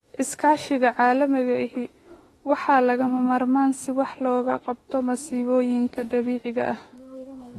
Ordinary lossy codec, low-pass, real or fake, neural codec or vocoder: AAC, 32 kbps; 19.8 kHz; fake; autoencoder, 48 kHz, 32 numbers a frame, DAC-VAE, trained on Japanese speech